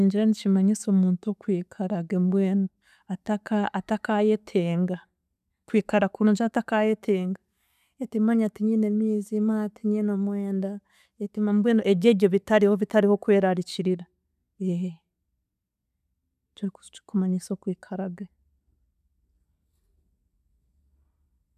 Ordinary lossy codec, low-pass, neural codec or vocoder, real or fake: none; 14.4 kHz; none; real